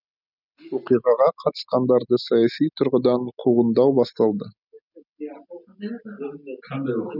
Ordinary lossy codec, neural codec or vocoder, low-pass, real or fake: none; none; 5.4 kHz; real